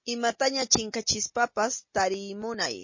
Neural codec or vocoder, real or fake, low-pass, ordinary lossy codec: none; real; 7.2 kHz; MP3, 32 kbps